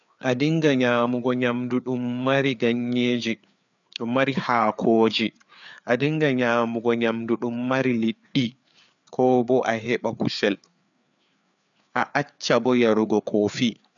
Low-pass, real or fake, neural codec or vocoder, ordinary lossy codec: 7.2 kHz; fake; codec, 16 kHz, 4 kbps, FreqCodec, larger model; none